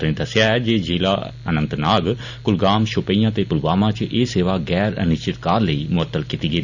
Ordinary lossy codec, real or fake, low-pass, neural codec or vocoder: none; real; 7.2 kHz; none